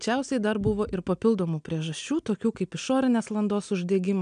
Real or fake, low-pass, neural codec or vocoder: real; 9.9 kHz; none